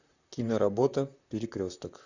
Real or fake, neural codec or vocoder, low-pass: fake; vocoder, 44.1 kHz, 128 mel bands, Pupu-Vocoder; 7.2 kHz